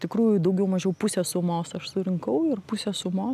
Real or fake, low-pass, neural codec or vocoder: real; 14.4 kHz; none